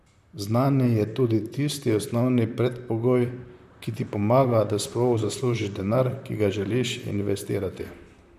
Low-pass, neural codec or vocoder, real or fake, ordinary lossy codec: 14.4 kHz; vocoder, 44.1 kHz, 128 mel bands, Pupu-Vocoder; fake; none